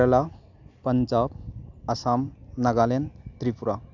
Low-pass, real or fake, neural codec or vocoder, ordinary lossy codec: 7.2 kHz; real; none; none